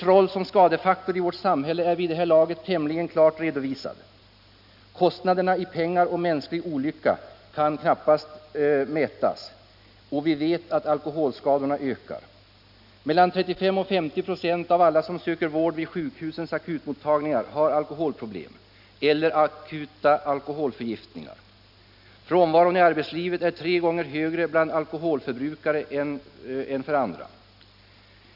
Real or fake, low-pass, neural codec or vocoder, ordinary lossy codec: real; 5.4 kHz; none; none